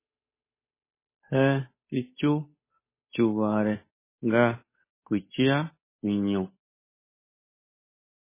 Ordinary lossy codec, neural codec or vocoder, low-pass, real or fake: MP3, 16 kbps; codec, 16 kHz, 8 kbps, FunCodec, trained on Chinese and English, 25 frames a second; 3.6 kHz; fake